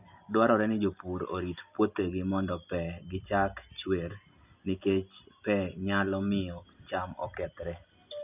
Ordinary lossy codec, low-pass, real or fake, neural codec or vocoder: none; 3.6 kHz; real; none